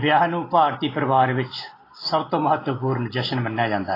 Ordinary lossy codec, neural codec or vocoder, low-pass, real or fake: AAC, 24 kbps; none; 5.4 kHz; real